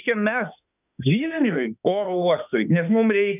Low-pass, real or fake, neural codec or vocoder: 3.6 kHz; fake; autoencoder, 48 kHz, 32 numbers a frame, DAC-VAE, trained on Japanese speech